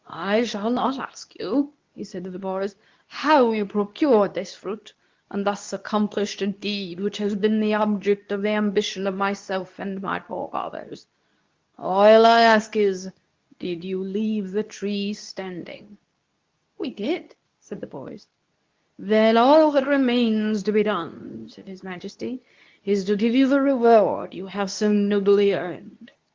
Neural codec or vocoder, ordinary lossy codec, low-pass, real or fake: codec, 24 kHz, 0.9 kbps, WavTokenizer, medium speech release version 2; Opus, 32 kbps; 7.2 kHz; fake